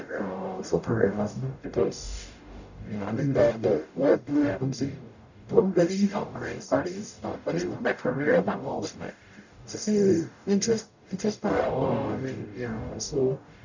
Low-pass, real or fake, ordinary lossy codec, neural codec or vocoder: 7.2 kHz; fake; none; codec, 44.1 kHz, 0.9 kbps, DAC